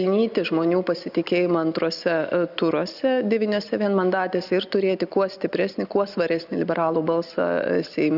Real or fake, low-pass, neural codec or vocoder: real; 5.4 kHz; none